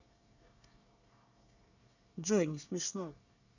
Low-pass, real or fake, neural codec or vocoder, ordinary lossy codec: 7.2 kHz; fake; codec, 24 kHz, 1 kbps, SNAC; none